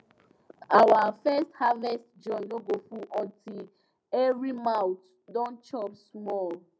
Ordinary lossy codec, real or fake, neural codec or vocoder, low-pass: none; real; none; none